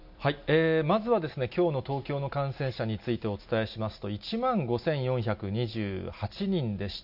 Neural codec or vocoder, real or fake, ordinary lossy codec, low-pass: none; real; none; 5.4 kHz